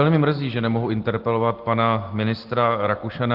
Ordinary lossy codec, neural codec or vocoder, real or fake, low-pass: Opus, 16 kbps; none; real; 5.4 kHz